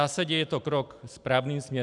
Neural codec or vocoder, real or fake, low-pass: none; real; 10.8 kHz